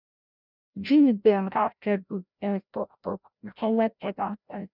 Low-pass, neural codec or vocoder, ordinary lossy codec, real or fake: 5.4 kHz; codec, 16 kHz, 0.5 kbps, FreqCodec, larger model; none; fake